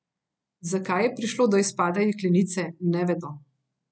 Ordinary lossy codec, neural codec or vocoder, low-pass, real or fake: none; none; none; real